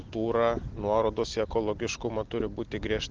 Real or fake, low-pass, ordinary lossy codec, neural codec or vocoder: real; 7.2 kHz; Opus, 16 kbps; none